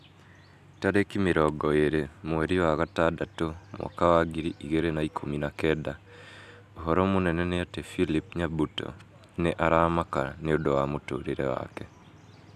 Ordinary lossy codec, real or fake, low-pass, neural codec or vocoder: none; real; 14.4 kHz; none